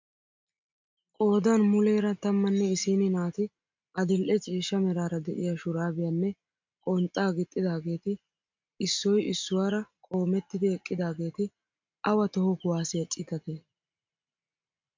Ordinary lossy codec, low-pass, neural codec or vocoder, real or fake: MP3, 64 kbps; 7.2 kHz; none; real